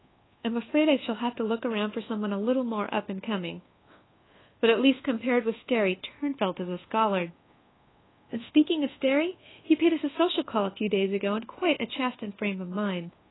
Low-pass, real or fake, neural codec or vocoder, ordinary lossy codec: 7.2 kHz; fake; codec, 24 kHz, 1.2 kbps, DualCodec; AAC, 16 kbps